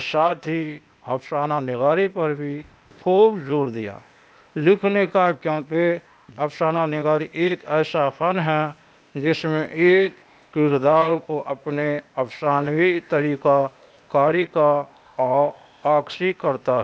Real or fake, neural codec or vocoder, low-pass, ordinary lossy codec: fake; codec, 16 kHz, 0.8 kbps, ZipCodec; none; none